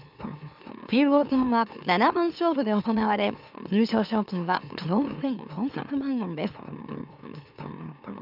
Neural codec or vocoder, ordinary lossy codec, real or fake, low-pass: autoencoder, 44.1 kHz, a latent of 192 numbers a frame, MeloTTS; none; fake; 5.4 kHz